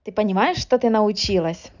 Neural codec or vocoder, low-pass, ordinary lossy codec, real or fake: none; 7.2 kHz; none; real